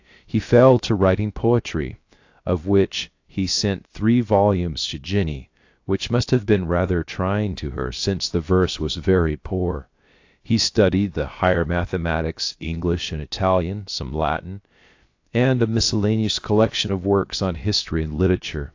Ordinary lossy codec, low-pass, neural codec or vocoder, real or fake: AAC, 48 kbps; 7.2 kHz; codec, 16 kHz, about 1 kbps, DyCAST, with the encoder's durations; fake